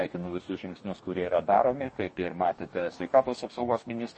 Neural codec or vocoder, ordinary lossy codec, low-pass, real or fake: codec, 44.1 kHz, 2.6 kbps, DAC; MP3, 32 kbps; 10.8 kHz; fake